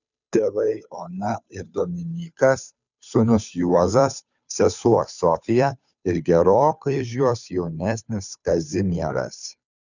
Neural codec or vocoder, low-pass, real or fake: codec, 16 kHz, 2 kbps, FunCodec, trained on Chinese and English, 25 frames a second; 7.2 kHz; fake